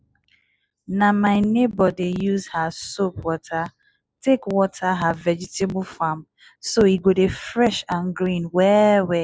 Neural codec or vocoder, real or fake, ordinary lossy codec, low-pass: none; real; none; none